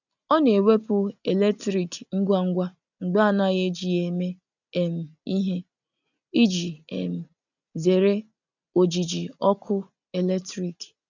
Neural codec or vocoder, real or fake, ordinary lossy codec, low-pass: none; real; none; 7.2 kHz